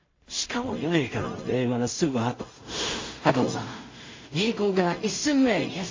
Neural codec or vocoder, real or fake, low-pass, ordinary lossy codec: codec, 16 kHz in and 24 kHz out, 0.4 kbps, LongCat-Audio-Codec, two codebook decoder; fake; 7.2 kHz; MP3, 32 kbps